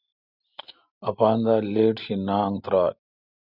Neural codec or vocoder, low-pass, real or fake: none; 5.4 kHz; real